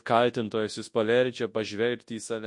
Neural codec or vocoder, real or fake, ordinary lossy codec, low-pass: codec, 24 kHz, 0.9 kbps, WavTokenizer, large speech release; fake; MP3, 48 kbps; 10.8 kHz